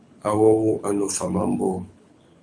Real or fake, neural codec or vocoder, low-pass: fake; codec, 24 kHz, 6 kbps, HILCodec; 9.9 kHz